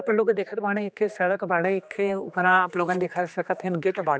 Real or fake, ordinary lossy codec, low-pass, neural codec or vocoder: fake; none; none; codec, 16 kHz, 2 kbps, X-Codec, HuBERT features, trained on general audio